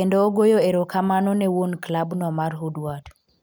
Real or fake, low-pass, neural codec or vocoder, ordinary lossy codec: real; none; none; none